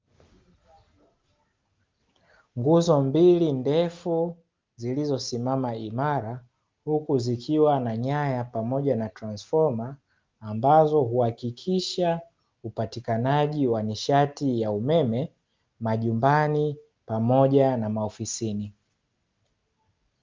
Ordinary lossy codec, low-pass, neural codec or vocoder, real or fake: Opus, 24 kbps; 7.2 kHz; none; real